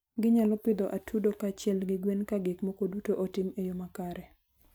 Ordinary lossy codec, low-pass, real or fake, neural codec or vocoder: none; none; real; none